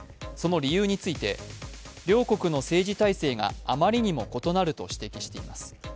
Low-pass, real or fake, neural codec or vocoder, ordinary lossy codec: none; real; none; none